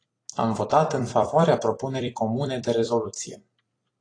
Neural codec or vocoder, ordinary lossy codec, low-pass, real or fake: vocoder, 44.1 kHz, 128 mel bands every 256 samples, BigVGAN v2; AAC, 32 kbps; 9.9 kHz; fake